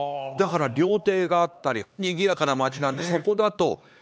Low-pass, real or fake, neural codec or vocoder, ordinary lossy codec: none; fake; codec, 16 kHz, 2 kbps, X-Codec, HuBERT features, trained on LibriSpeech; none